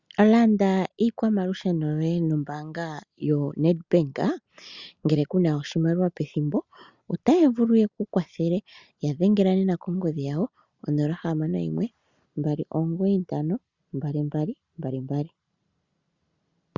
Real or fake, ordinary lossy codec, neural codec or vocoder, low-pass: real; Opus, 64 kbps; none; 7.2 kHz